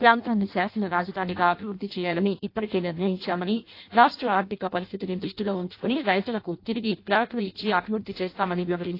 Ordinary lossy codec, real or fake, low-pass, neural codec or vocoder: AAC, 32 kbps; fake; 5.4 kHz; codec, 16 kHz in and 24 kHz out, 0.6 kbps, FireRedTTS-2 codec